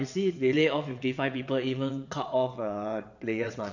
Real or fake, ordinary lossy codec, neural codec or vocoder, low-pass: fake; none; vocoder, 22.05 kHz, 80 mel bands, WaveNeXt; 7.2 kHz